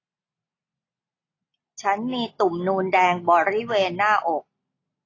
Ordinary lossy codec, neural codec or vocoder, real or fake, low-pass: AAC, 32 kbps; none; real; 7.2 kHz